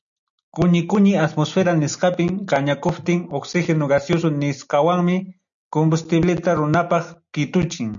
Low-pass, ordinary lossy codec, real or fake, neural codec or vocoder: 7.2 kHz; MP3, 96 kbps; real; none